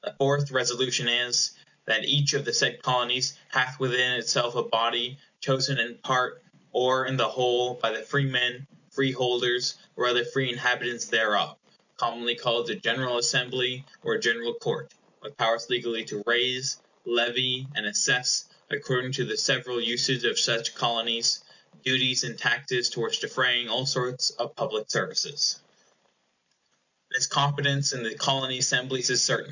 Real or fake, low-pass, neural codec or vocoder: real; 7.2 kHz; none